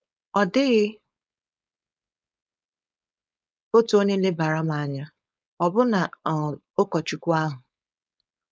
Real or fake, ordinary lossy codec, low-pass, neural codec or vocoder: fake; none; none; codec, 16 kHz, 4.8 kbps, FACodec